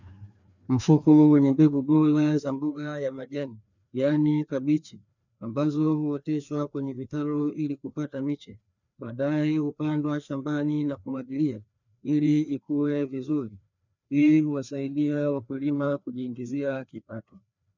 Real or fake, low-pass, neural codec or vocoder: fake; 7.2 kHz; codec, 16 kHz, 2 kbps, FreqCodec, larger model